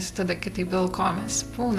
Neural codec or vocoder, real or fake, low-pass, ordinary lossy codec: none; real; 14.4 kHz; AAC, 64 kbps